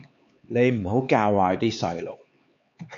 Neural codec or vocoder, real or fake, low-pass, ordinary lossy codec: codec, 16 kHz, 4 kbps, X-Codec, HuBERT features, trained on LibriSpeech; fake; 7.2 kHz; MP3, 48 kbps